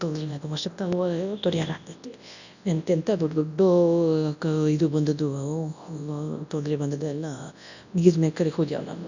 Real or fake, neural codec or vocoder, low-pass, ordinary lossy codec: fake; codec, 24 kHz, 0.9 kbps, WavTokenizer, large speech release; 7.2 kHz; none